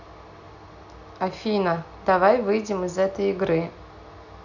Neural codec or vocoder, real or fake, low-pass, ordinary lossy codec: none; real; 7.2 kHz; none